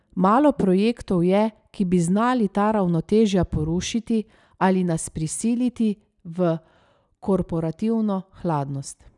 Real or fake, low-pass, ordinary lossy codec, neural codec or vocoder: real; 10.8 kHz; none; none